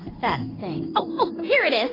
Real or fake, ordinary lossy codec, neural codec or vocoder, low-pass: fake; AAC, 24 kbps; codec, 16 kHz in and 24 kHz out, 1 kbps, XY-Tokenizer; 5.4 kHz